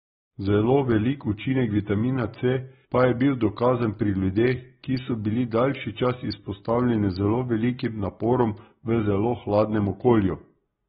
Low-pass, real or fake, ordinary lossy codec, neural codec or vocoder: 7.2 kHz; real; AAC, 16 kbps; none